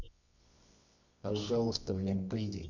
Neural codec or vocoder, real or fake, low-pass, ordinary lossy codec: codec, 24 kHz, 0.9 kbps, WavTokenizer, medium music audio release; fake; 7.2 kHz; none